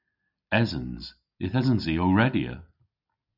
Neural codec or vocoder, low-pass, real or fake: none; 5.4 kHz; real